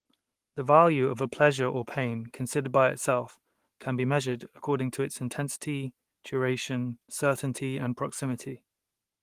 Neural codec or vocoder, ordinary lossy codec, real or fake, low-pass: codec, 44.1 kHz, 7.8 kbps, Pupu-Codec; Opus, 24 kbps; fake; 14.4 kHz